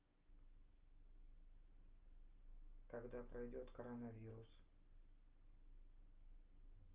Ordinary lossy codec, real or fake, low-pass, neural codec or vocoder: Opus, 32 kbps; real; 3.6 kHz; none